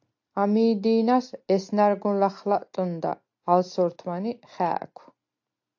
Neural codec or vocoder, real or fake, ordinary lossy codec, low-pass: none; real; AAC, 48 kbps; 7.2 kHz